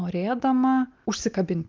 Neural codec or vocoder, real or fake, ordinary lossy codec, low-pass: none; real; Opus, 32 kbps; 7.2 kHz